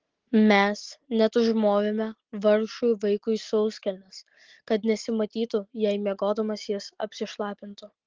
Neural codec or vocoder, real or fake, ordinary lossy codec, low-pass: none; real; Opus, 16 kbps; 7.2 kHz